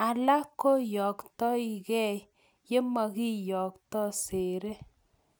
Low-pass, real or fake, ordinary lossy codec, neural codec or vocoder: none; real; none; none